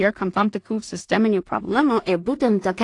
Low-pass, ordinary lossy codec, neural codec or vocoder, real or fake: 10.8 kHz; AAC, 48 kbps; codec, 16 kHz in and 24 kHz out, 0.4 kbps, LongCat-Audio-Codec, two codebook decoder; fake